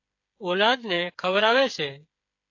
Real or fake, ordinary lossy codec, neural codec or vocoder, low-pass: fake; AAC, 48 kbps; codec, 16 kHz, 8 kbps, FreqCodec, smaller model; 7.2 kHz